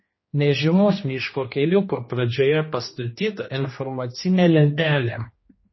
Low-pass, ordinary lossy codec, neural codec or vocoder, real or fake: 7.2 kHz; MP3, 24 kbps; codec, 16 kHz, 1 kbps, X-Codec, HuBERT features, trained on general audio; fake